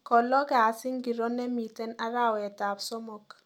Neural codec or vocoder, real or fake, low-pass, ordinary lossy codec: none; real; 19.8 kHz; none